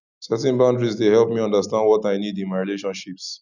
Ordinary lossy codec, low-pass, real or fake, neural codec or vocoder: none; 7.2 kHz; real; none